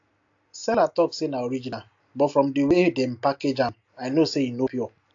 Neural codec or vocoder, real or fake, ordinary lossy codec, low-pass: none; real; AAC, 48 kbps; 7.2 kHz